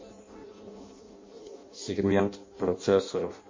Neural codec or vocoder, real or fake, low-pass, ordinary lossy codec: codec, 16 kHz in and 24 kHz out, 0.6 kbps, FireRedTTS-2 codec; fake; 7.2 kHz; MP3, 32 kbps